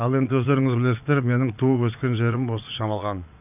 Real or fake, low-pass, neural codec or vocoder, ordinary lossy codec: fake; 3.6 kHz; vocoder, 22.05 kHz, 80 mel bands, Vocos; none